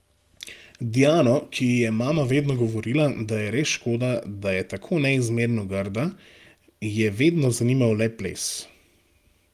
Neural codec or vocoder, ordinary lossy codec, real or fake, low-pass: none; Opus, 32 kbps; real; 14.4 kHz